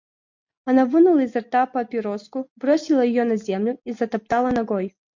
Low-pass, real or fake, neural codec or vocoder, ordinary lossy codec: 7.2 kHz; real; none; MP3, 48 kbps